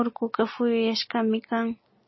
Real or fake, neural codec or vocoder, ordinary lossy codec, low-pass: real; none; MP3, 24 kbps; 7.2 kHz